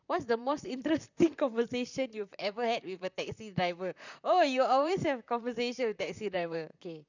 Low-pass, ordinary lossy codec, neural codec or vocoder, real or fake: 7.2 kHz; none; vocoder, 44.1 kHz, 128 mel bands, Pupu-Vocoder; fake